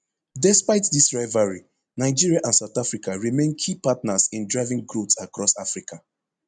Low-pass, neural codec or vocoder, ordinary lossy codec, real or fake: 9.9 kHz; none; none; real